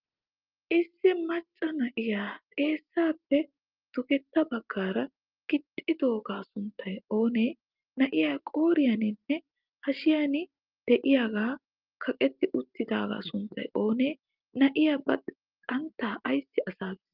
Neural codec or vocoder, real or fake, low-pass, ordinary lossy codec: none; real; 5.4 kHz; Opus, 32 kbps